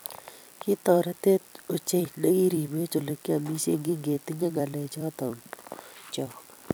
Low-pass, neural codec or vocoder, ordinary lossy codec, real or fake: none; vocoder, 44.1 kHz, 128 mel bands every 256 samples, BigVGAN v2; none; fake